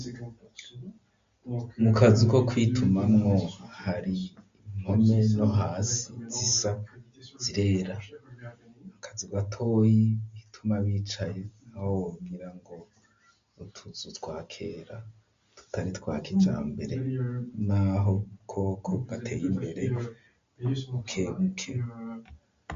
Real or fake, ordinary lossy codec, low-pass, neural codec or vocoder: real; MP3, 48 kbps; 7.2 kHz; none